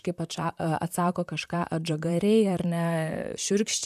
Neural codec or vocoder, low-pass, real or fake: vocoder, 44.1 kHz, 128 mel bands, Pupu-Vocoder; 14.4 kHz; fake